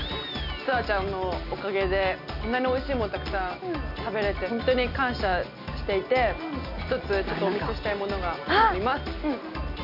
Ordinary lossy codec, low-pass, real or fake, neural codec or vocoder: none; 5.4 kHz; real; none